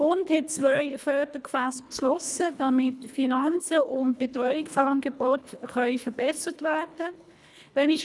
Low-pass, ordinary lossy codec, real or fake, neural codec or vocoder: none; none; fake; codec, 24 kHz, 1.5 kbps, HILCodec